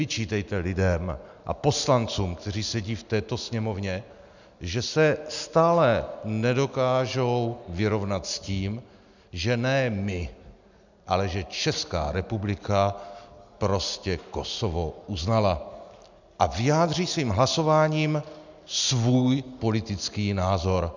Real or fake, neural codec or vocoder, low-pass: real; none; 7.2 kHz